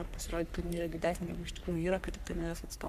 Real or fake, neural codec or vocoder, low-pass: fake; codec, 44.1 kHz, 3.4 kbps, Pupu-Codec; 14.4 kHz